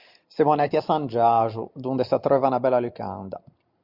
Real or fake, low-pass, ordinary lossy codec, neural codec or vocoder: real; 5.4 kHz; Opus, 64 kbps; none